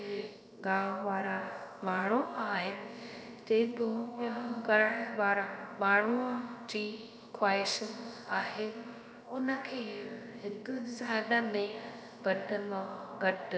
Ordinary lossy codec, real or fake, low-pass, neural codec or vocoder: none; fake; none; codec, 16 kHz, about 1 kbps, DyCAST, with the encoder's durations